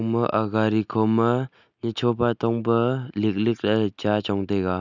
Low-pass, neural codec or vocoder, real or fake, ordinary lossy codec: 7.2 kHz; none; real; none